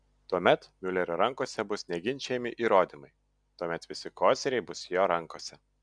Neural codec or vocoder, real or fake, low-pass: none; real; 9.9 kHz